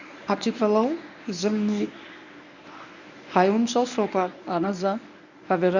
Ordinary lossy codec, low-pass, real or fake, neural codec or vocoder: none; 7.2 kHz; fake; codec, 24 kHz, 0.9 kbps, WavTokenizer, medium speech release version 1